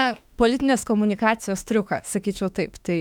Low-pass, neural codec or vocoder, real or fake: 19.8 kHz; autoencoder, 48 kHz, 32 numbers a frame, DAC-VAE, trained on Japanese speech; fake